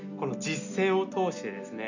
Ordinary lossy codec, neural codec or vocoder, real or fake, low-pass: none; none; real; 7.2 kHz